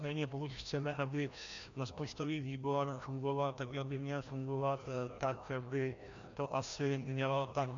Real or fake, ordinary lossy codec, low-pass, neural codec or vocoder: fake; MP3, 64 kbps; 7.2 kHz; codec, 16 kHz, 1 kbps, FreqCodec, larger model